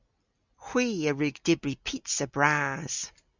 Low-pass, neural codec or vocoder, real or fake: 7.2 kHz; none; real